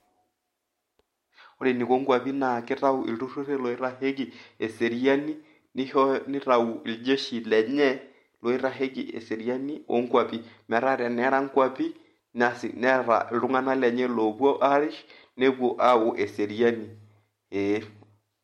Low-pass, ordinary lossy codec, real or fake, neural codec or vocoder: 19.8 kHz; MP3, 64 kbps; real; none